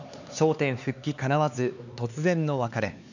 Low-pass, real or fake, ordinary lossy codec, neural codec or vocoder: 7.2 kHz; fake; none; codec, 16 kHz, 4 kbps, X-Codec, HuBERT features, trained on LibriSpeech